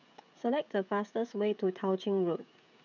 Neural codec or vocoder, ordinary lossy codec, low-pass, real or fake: codec, 16 kHz, 16 kbps, FreqCodec, smaller model; none; 7.2 kHz; fake